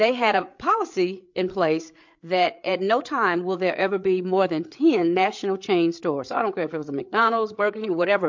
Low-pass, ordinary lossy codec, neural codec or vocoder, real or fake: 7.2 kHz; MP3, 48 kbps; codec, 16 kHz, 8 kbps, FreqCodec, larger model; fake